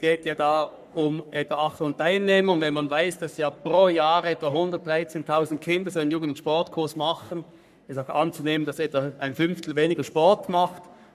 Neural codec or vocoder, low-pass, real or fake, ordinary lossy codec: codec, 44.1 kHz, 3.4 kbps, Pupu-Codec; 14.4 kHz; fake; none